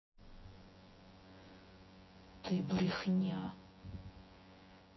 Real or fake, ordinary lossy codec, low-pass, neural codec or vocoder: fake; MP3, 24 kbps; 7.2 kHz; vocoder, 24 kHz, 100 mel bands, Vocos